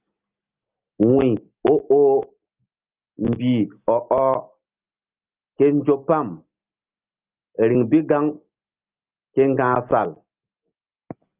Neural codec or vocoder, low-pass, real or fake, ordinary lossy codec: none; 3.6 kHz; real; Opus, 24 kbps